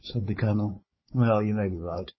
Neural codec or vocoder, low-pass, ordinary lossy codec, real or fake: codec, 16 kHz, 4 kbps, FunCodec, trained on Chinese and English, 50 frames a second; 7.2 kHz; MP3, 24 kbps; fake